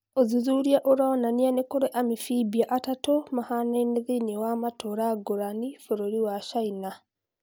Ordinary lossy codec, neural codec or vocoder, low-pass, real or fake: none; none; none; real